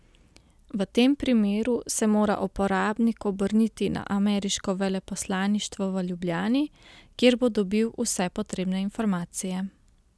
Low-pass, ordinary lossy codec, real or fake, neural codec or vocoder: none; none; real; none